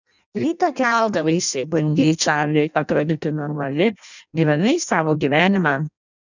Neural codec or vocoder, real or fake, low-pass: codec, 16 kHz in and 24 kHz out, 0.6 kbps, FireRedTTS-2 codec; fake; 7.2 kHz